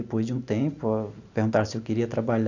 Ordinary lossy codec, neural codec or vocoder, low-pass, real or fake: none; none; 7.2 kHz; real